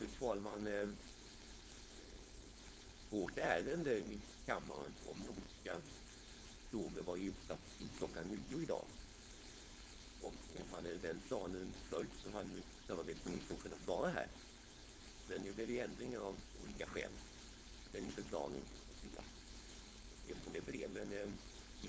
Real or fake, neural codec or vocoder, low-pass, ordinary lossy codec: fake; codec, 16 kHz, 4.8 kbps, FACodec; none; none